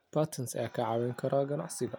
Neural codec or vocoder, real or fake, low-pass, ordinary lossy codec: none; real; none; none